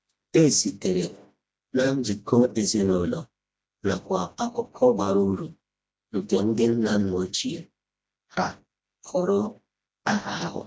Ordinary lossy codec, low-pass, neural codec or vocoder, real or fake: none; none; codec, 16 kHz, 1 kbps, FreqCodec, smaller model; fake